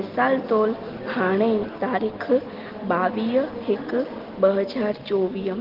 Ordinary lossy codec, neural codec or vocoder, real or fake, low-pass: Opus, 16 kbps; none; real; 5.4 kHz